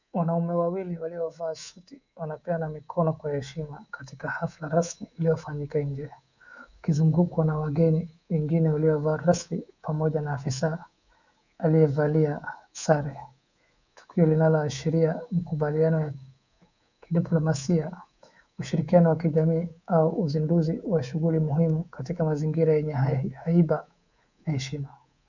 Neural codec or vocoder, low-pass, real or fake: codec, 24 kHz, 3.1 kbps, DualCodec; 7.2 kHz; fake